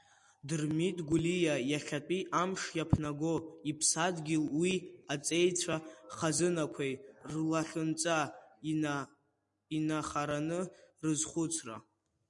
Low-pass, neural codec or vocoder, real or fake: 10.8 kHz; none; real